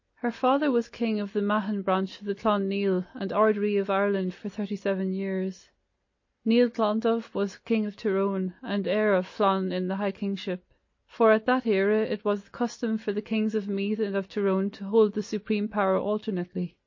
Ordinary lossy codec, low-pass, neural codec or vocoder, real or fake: MP3, 32 kbps; 7.2 kHz; none; real